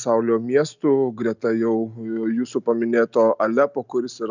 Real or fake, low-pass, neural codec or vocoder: real; 7.2 kHz; none